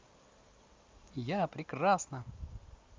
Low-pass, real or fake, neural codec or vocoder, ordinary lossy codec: 7.2 kHz; real; none; Opus, 24 kbps